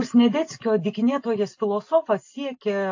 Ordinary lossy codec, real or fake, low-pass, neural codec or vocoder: AAC, 48 kbps; real; 7.2 kHz; none